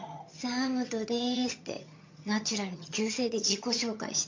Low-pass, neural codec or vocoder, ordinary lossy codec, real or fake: 7.2 kHz; vocoder, 22.05 kHz, 80 mel bands, HiFi-GAN; MP3, 48 kbps; fake